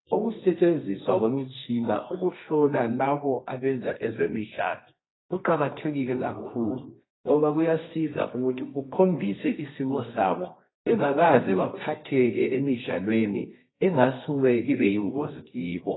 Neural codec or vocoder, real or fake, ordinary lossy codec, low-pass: codec, 24 kHz, 0.9 kbps, WavTokenizer, medium music audio release; fake; AAC, 16 kbps; 7.2 kHz